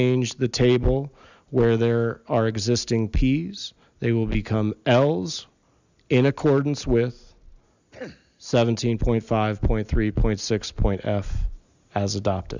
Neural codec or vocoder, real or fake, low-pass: none; real; 7.2 kHz